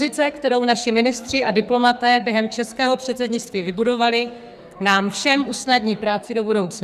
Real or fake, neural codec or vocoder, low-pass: fake; codec, 44.1 kHz, 2.6 kbps, SNAC; 14.4 kHz